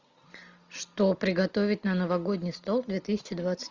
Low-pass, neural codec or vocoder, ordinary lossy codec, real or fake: 7.2 kHz; none; Opus, 64 kbps; real